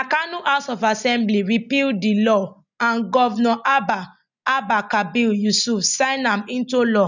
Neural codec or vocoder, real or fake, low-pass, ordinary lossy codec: none; real; 7.2 kHz; none